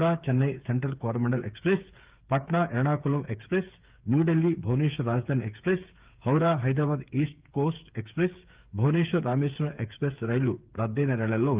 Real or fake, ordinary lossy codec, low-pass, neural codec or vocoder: fake; Opus, 32 kbps; 3.6 kHz; codec, 16 kHz, 8 kbps, FreqCodec, smaller model